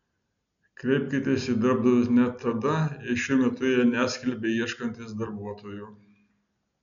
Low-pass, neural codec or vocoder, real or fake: 7.2 kHz; none; real